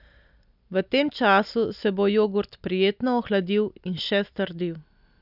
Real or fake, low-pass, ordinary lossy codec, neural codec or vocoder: real; 5.4 kHz; none; none